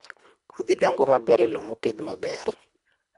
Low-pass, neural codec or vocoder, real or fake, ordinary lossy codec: 10.8 kHz; codec, 24 kHz, 1.5 kbps, HILCodec; fake; none